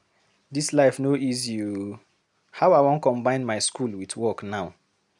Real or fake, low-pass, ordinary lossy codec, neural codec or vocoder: real; 10.8 kHz; none; none